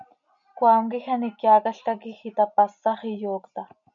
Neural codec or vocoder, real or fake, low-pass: none; real; 7.2 kHz